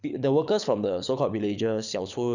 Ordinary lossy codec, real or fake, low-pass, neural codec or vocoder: none; fake; 7.2 kHz; codec, 24 kHz, 6 kbps, HILCodec